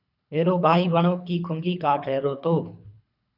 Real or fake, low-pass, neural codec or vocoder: fake; 5.4 kHz; codec, 24 kHz, 3 kbps, HILCodec